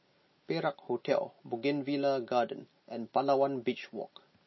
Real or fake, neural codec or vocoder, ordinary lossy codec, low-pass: real; none; MP3, 24 kbps; 7.2 kHz